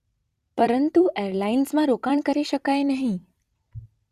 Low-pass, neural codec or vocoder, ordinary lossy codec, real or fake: 14.4 kHz; vocoder, 44.1 kHz, 128 mel bands every 256 samples, BigVGAN v2; Opus, 64 kbps; fake